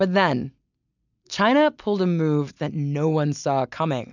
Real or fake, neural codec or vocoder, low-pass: real; none; 7.2 kHz